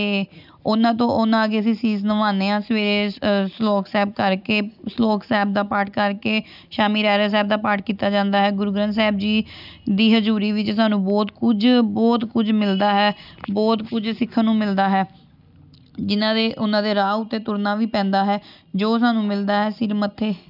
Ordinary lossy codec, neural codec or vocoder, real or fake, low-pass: none; none; real; 5.4 kHz